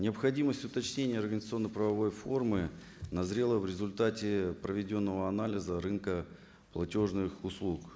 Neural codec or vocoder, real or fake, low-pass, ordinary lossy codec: none; real; none; none